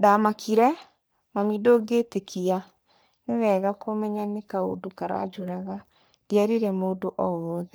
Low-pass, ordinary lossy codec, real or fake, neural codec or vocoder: none; none; fake; codec, 44.1 kHz, 3.4 kbps, Pupu-Codec